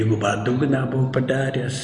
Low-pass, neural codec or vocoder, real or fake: 10.8 kHz; none; real